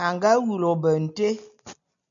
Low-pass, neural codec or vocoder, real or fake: 7.2 kHz; none; real